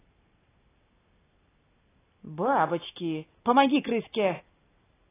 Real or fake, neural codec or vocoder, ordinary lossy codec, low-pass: real; none; AAC, 16 kbps; 3.6 kHz